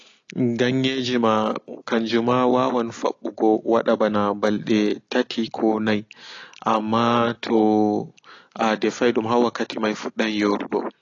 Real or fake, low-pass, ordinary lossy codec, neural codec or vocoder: real; 7.2 kHz; AAC, 32 kbps; none